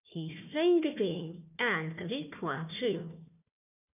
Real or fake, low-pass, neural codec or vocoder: fake; 3.6 kHz; codec, 16 kHz, 1 kbps, FunCodec, trained on Chinese and English, 50 frames a second